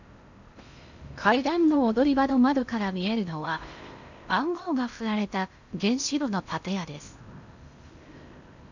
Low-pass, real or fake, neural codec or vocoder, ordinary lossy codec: 7.2 kHz; fake; codec, 16 kHz in and 24 kHz out, 0.8 kbps, FocalCodec, streaming, 65536 codes; none